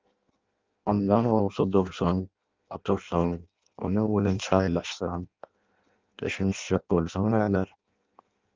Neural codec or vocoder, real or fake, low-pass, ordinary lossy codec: codec, 16 kHz in and 24 kHz out, 0.6 kbps, FireRedTTS-2 codec; fake; 7.2 kHz; Opus, 32 kbps